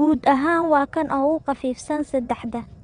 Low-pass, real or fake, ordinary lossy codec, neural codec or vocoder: 9.9 kHz; fake; Opus, 64 kbps; vocoder, 22.05 kHz, 80 mel bands, WaveNeXt